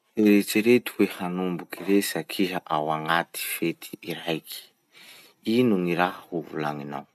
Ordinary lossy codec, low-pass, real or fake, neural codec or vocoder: none; 14.4 kHz; real; none